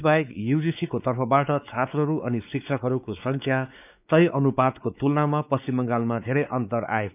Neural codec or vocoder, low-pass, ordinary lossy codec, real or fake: codec, 16 kHz, 4 kbps, X-Codec, WavLM features, trained on Multilingual LibriSpeech; 3.6 kHz; none; fake